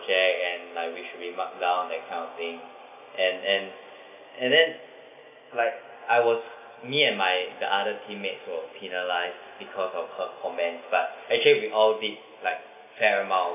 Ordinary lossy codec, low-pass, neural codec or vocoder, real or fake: none; 3.6 kHz; none; real